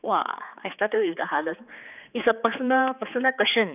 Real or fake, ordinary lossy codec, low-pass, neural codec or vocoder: fake; none; 3.6 kHz; codec, 16 kHz, 4 kbps, X-Codec, HuBERT features, trained on general audio